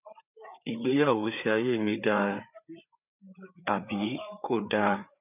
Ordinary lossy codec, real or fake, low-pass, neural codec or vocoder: none; fake; 3.6 kHz; codec, 16 kHz, 4 kbps, FreqCodec, larger model